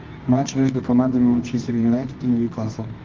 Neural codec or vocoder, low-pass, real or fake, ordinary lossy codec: codec, 24 kHz, 0.9 kbps, WavTokenizer, medium music audio release; 7.2 kHz; fake; Opus, 32 kbps